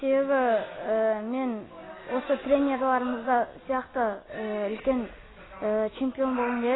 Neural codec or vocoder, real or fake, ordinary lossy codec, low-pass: none; real; AAC, 16 kbps; 7.2 kHz